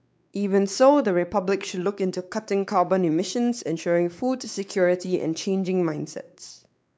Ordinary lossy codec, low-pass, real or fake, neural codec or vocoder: none; none; fake; codec, 16 kHz, 4 kbps, X-Codec, WavLM features, trained on Multilingual LibriSpeech